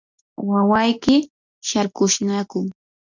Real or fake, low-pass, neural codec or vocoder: real; 7.2 kHz; none